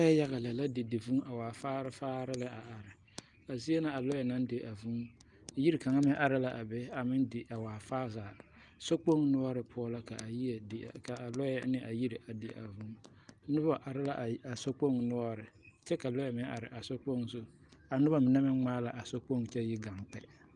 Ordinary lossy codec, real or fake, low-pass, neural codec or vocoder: Opus, 32 kbps; real; 10.8 kHz; none